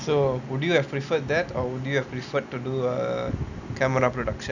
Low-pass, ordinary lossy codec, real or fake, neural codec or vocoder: 7.2 kHz; none; real; none